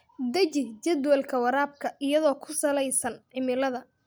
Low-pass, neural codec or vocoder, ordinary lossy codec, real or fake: none; none; none; real